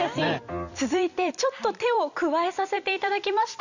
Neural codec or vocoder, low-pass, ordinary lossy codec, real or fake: vocoder, 44.1 kHz, 128 mel bands every 256 samples, BigVGAN v2; 7.2 kHz; none; fake